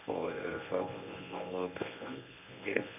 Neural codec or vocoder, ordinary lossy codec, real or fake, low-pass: codec, 24 kHz, 0.9 kbps, WavTokenizer, medium speech release version 1; none; fake; 3.6 kHz